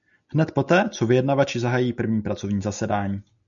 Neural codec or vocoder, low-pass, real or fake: none; 7.2 kHz; real